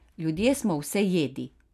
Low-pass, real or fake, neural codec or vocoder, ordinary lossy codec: 14.4 kHz; real; none; none